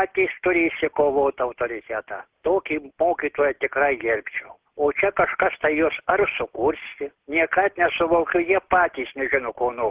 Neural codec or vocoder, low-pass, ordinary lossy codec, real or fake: none; 3.6 kHz; Opus, 16 kbps; real